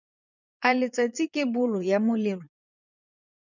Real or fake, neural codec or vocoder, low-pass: fake; vocoder, 22.05 kHz, 80 mel bands, Vocos; 7.2 kHz